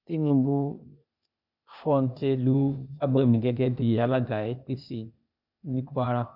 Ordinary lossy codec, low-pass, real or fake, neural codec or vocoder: none; 5.4 kHz; fake; codec, 16 kHz, 0.8 kbps, ZipCodec